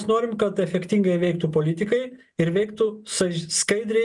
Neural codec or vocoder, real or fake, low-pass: none; real; 10.8 kHz